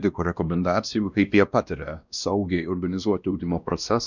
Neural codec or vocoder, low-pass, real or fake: codec, 16 kHz, 1 kbps, X-Codec, WavLM features, trained on Multilingual LibriSpeech; 7.2 kHz; fake